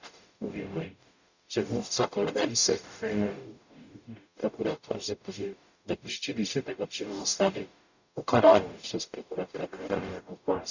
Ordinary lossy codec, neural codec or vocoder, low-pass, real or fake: none; codec, 44.1 kHz, 0.9 kbps, DAC; 7.2 kHz; fake